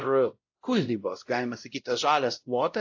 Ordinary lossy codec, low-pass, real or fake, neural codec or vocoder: AAC, 48 kbps; 7.2 kHz; fake; codec, 16 kHz, 0.5 kbps, X-Codec, WavLM features, trained on Multilingual LibriSpeech